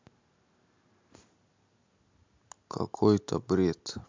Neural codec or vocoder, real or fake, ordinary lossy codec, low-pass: none; real; MP3, 64 kbps; 7.2 kHz